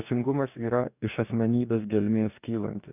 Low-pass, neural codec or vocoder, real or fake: 3.6 kHz; codec, 44.1 kHz, 2.6 kbps, DAC; fake